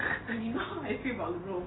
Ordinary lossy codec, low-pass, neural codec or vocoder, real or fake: AAC, 16 kbps; 7.2 kHz; none; real